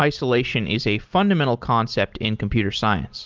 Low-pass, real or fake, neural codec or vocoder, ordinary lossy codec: 7.2 kHz; real; none; Opus, 32 kbps